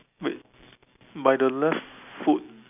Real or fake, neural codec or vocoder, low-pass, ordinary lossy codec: real; none; 3.6 kHz; none